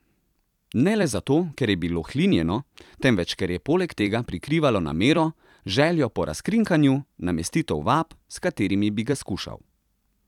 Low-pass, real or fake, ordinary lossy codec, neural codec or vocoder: 19.8 kHz; fake; none; vocoder, 44.1 kHz, 128 mel bands every 256 samples, BigVGAN v2